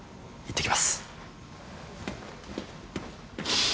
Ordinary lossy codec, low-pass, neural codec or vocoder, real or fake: none; none; none; real